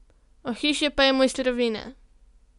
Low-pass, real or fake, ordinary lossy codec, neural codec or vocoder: 10.8 kHz; real; none; none